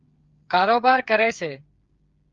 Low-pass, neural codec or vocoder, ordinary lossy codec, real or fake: 7.2 kHz; codec, 16 kHz, 8 kbps, FreqCodec, smaller model; Opus, 32 kbps; fake